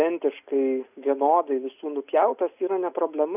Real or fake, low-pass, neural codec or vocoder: real; 3.6 kHz; none